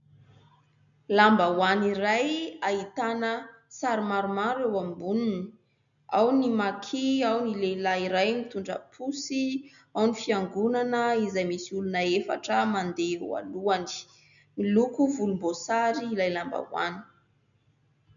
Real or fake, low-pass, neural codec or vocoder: real; 7.2 kHz; none